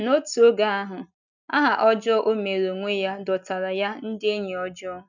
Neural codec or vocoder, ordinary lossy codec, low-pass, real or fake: none; none; 7.2 kHz; real